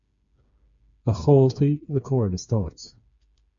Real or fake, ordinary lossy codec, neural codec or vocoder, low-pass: fake; MP3, 64 kbps; codec, 16 kHz, 4 kbps, FreqCodec, smaller model; 7.2 kHz